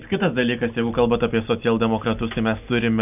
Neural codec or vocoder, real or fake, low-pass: none; real; 3.6 kHz